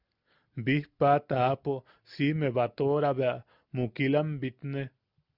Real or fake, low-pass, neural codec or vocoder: real; 5.4 kHz; none